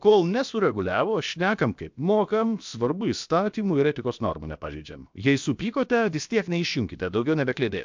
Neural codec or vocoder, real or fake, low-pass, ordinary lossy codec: codec, 16 kHz, about 1 kbps, DyCAST, with the encoder's durations; fake; 7.2 kHz; MP3, 64 kbps